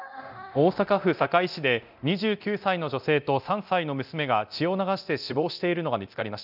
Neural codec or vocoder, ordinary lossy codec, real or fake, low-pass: codec, 24 kHz, 0.9 kbps, DualCodec; none; fake; 5.4 kHz